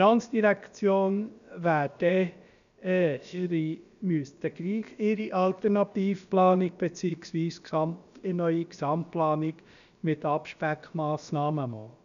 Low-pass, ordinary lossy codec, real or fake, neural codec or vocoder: 7.2 kHz; none; fake; codec, 16 kHz, about 1 kbps, DyCAST, with the encoder's durations